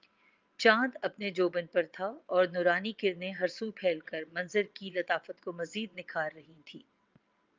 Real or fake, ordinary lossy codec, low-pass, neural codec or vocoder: fake; Opus, 24 kbps; 7.2 kHz; vocoder, 22.05 kHz, 80 mel bands, WaveNeXt